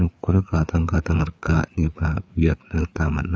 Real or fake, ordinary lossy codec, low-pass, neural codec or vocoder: fake; none; none; codec, 16 kHz, 4 kbps, FreqCodec, larger model